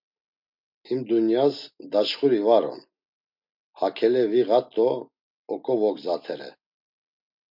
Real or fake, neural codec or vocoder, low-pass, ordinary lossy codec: real; none; 5.4 kHz; MP3, 48 kbps